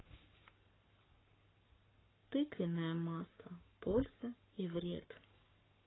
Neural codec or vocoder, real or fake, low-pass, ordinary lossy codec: codec, 44.1 kHz, 7.8 kbps, Pupu-Codec; fake; 7.2 kHz; AAC, 16 kbps